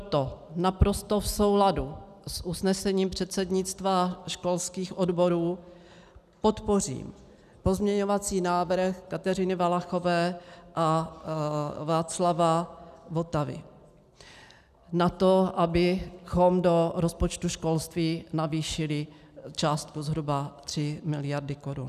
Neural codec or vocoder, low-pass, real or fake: none; 14.4 kHz; real